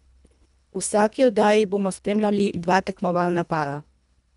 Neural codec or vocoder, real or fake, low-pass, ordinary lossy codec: codec, 24 kHz, 1.5 kbps, HILCodec; fake; 10.8 kHz; none